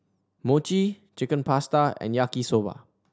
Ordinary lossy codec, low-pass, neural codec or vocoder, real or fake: none; none; none; real